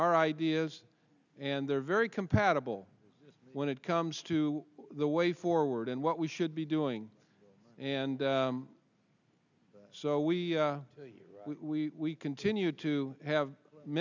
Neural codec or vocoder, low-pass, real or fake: none; 7.2 kHz; real